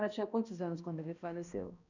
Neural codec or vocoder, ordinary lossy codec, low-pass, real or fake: codec, 16 kHz, 1 kbps, X-Codec, HuBERT features, trained on balanced general audio; none; 7.2 kHz; fake